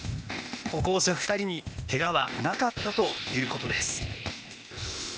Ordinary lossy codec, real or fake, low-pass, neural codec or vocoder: none; fake; none; codec, 16 kHz, 0.8 kbps, ZipCodec